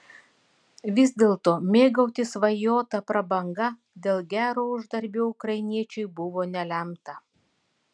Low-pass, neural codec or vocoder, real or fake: 9.9 kHz; none; real